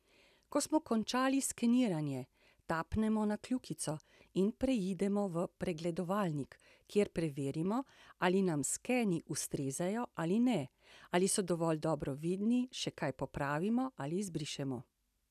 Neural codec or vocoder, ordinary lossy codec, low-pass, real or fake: none; none; 14.4 kHz; real